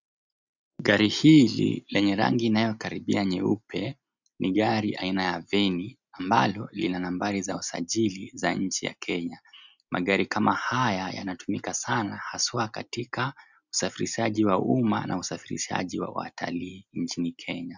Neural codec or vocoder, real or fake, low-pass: none; real; 7.2 kHz